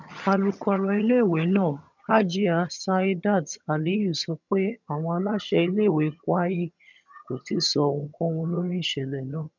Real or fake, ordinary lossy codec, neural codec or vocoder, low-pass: fake; none; vocoder, 22.05 kHz, 80 mel bands, HiFi-GAN; 7.2 kHz